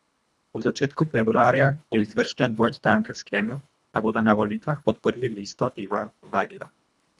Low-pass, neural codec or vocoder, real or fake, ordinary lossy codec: none; codec, 24 kHz, 1.5 kbps, HILCodec; fake; none